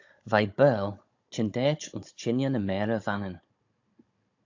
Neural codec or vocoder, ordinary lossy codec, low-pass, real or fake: codec, 16 kHz, 16 kbps, FunCodec, trained on Chinese and English, 50 frames a second; AAC, 48 kbps; 7.2 kHz; fake